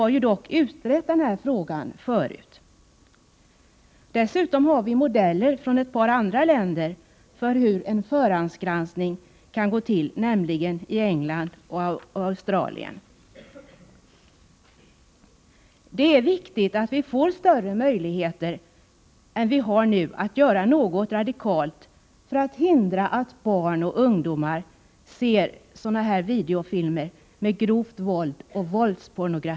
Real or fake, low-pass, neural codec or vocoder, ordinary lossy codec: real; none; none; none